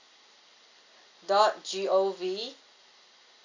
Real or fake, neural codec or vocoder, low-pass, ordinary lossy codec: real; none; 7.2 kHz; AAC, 48 kbps